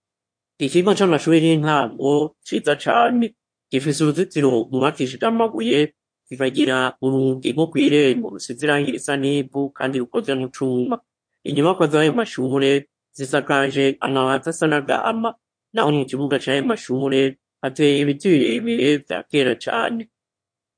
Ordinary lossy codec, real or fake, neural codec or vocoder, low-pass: MP3, 48 kbps; fake; autoencoder, 22.05 kHz, a latent of 192 numbers a frame, VITS, trained on one speaker; 9.9 kHz